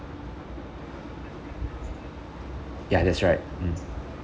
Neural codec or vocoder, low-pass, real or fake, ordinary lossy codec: none; none; real; none